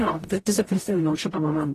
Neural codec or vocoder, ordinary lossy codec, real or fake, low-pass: codec, 44.1 kHz, 0.9 kbps, DAC; AAC, 48 kbps; fake; 14.4 kHz